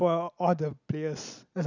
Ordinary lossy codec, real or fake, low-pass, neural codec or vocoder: none; real; 7.2 kHz; none